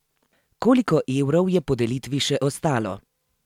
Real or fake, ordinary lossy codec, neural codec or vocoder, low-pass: real; MP3, 96 kbps; none; 19.8 kHz